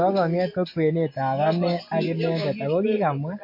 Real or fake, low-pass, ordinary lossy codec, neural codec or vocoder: real; 5.4 kHz; MP3, 32 kbps; none